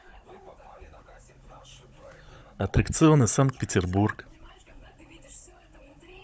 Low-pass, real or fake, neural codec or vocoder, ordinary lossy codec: none; fake; codec, 16 kHz, 16 kbps, FunCodec, trained on Chinese and English, 50 frames a second; none